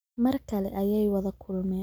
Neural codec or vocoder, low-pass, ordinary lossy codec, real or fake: none; none; none; real